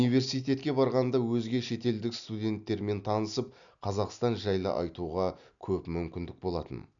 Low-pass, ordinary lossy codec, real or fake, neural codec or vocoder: 7.2 kHz; none; real; none